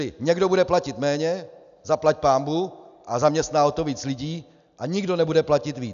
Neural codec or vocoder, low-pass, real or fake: none; 7.2 kHz; real